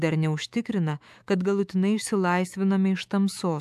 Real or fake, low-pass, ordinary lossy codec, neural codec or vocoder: fake; 14.4 kHz; AAC, 96 kbps; autoencoder, 48 kHz, 128 numbers a frame, DAC-VAE, trained on Japanese speech